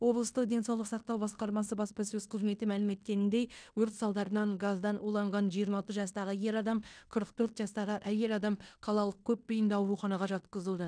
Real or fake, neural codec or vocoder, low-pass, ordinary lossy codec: fake; codec, 16 kHz in and 24 kHz out, 0.9 kbps, LongCat-Audio-Codec, fine tuned four codebook decoder; 9.9 kHz; none